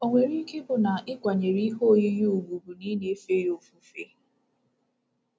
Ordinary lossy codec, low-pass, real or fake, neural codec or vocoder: none; none; real; none